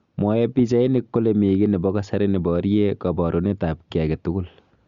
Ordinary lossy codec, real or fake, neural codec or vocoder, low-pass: Opus, 64 kbps; real; none; 7.2 kHz